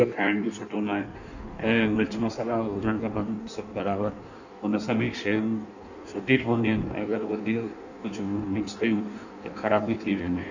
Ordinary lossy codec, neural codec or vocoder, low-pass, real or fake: none; codec, 16 kHz in and 24 kHz out, 1.1 kbps, FireRedTTS-2 codec; 7.2 kHz; fake